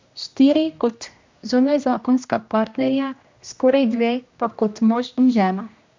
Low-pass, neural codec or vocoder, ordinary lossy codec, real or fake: 7.2 kHz; codec, 16 kHz, 1 kbps, X-Codec, HuBERT features, trained on general audio; MP3, 64 kbps; fake